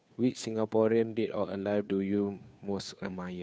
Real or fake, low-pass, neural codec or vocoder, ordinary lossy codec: fake; none; codec, 16 kHz, 2 kbps, FunCodec, trained on Chinese and English, 25 frames a second; none